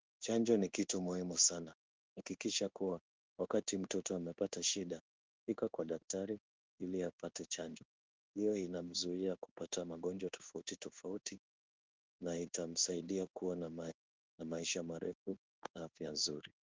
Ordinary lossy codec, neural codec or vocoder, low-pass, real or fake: Opus, 32 kbps; codec, 16 kHz in and 24 kHz out, 1 kbps, XY-Tokenizer; 7.2 kHz; fake